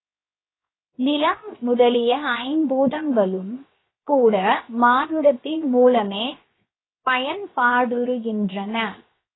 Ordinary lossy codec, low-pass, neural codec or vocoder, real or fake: AAC, 16 kbps; 7.2 kHz; codec, 16 kHz, 0.7 kbps, FocalCodec; fake